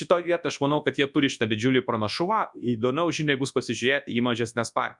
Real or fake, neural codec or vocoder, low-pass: fake; codec, 24 kHz, 0.9 kbps, WavTokenizer, large speech release; 10.8 kHz